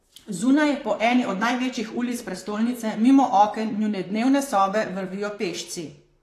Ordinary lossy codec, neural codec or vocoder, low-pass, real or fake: AAC, 48 kbps; vocoder, 44.1 kHz, 128 mel bands, Pupu-Vocoder; 14.4 kHz; fake